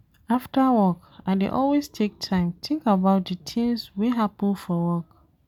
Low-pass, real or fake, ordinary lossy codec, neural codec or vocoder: 19.8 kHz; real; none; none